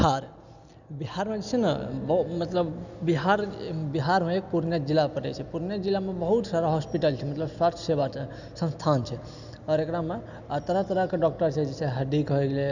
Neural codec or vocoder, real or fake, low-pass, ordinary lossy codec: none; real; 7.2 kHz; none